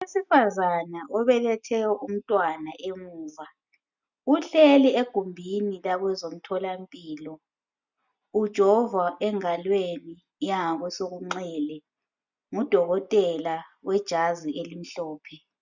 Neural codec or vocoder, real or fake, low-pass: none; real; 7.2 kHz